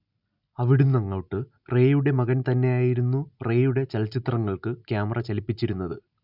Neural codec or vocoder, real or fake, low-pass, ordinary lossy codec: none; real; 5.4 kHz; none